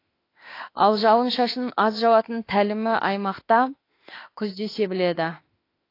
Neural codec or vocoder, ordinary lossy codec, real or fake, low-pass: autoencoder, 48 kHz, 32 numbers a frame, DAC-VAE, trained on Japanese speech; AAC, 32 kbps; fake; 5.4 kHz